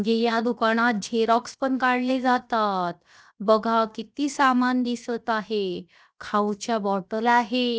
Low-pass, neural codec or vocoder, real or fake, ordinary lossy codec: none; codec, 16 kHz, 0.7 kbps, FocalCodec; fake; none